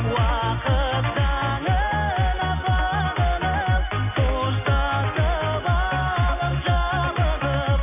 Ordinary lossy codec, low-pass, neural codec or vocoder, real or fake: none; 3.6 kHz; none; real